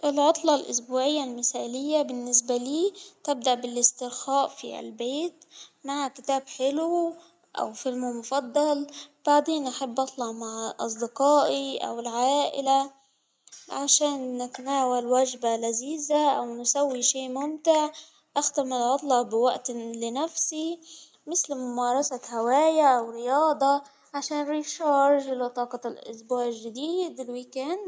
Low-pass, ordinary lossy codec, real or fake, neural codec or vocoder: none; none; real; none